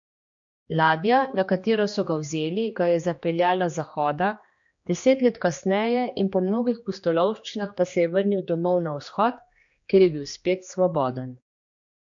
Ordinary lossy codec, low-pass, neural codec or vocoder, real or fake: MP3, 48 kbps; 7.2 kHz; codec, 16 kHz, 2 kbps, X-Codec, HuBERT features, trained on general audio; fake